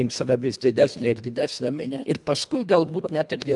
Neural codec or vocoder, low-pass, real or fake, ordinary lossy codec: codec, 24 kHz, 1.5 kbps, HILCodec; 10.8 kHz; fake; MP3, 96 kbps